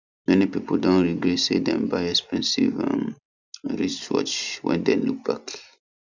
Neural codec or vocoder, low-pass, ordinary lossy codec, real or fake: none; 7.2 kHz; none; real